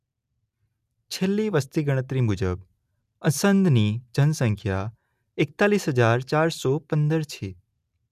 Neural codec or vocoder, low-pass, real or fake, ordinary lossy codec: none; 14.4 kHz; real; none